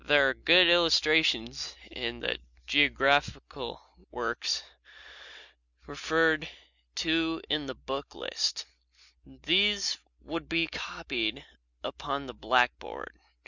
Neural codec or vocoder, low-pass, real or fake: none; 7.2 kHz; real